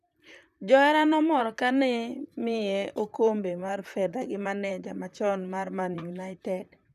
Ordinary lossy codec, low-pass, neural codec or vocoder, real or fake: none; 14.4 kHz; vocoder, 44.1 kHz, 128 mel bands, Pupu-Vocoder; fake